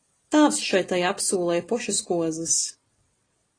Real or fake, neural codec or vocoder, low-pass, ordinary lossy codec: real; none; 9.9 kHz; AAC, 32 kbps